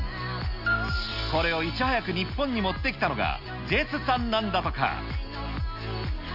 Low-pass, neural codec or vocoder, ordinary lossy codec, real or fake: 5.4 kHz; none; none; real